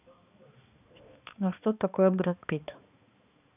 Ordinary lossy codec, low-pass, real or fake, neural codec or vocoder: none; 3.6 kHz; fake; codec, 16 kHz, 2 kbps, X-Codec, HuBERT features, trained on balanced general audio